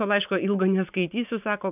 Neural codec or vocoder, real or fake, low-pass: none; real; 3.6 kHz